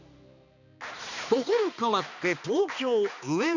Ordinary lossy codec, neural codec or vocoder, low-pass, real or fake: none; codec, 16 kHz, 2 kbps, X-Codec, HuBERT features, trained on balanced general audio; 7.2 kHz; fake